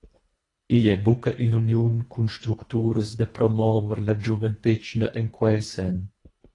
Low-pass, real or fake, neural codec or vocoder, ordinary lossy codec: 10.8 kHz; fake; codec, 24 kHz, 1.5 kbps, HILCodec; AAC, 32 kbps